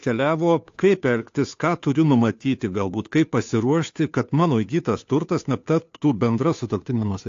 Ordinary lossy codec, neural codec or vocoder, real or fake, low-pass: AAC, 48 kbps; codec, 16 kHz, 2 kbps, FunCodec, trained on Chinese and English, 25 frames a second; fake; 7.2 kHz